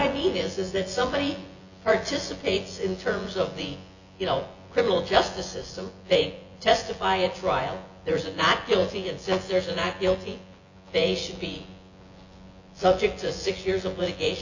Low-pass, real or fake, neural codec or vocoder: 7.2 kHz; fake; vocoder, 24 kHz, 100 mel bands, Vocos